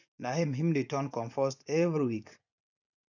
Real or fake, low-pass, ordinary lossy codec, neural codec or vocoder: fake; 7.2 kHz; none; vocoder, 24 kHz, 100 mel bands, Vocos